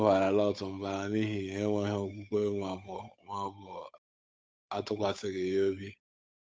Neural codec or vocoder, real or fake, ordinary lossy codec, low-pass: codec, 16 kHz, 8 kbps, FunCodec, trained on Chinese and English, 25 frames a second; fake; none; none